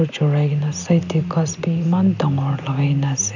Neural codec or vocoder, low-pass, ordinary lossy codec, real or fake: none; 7.2 kHz; none; real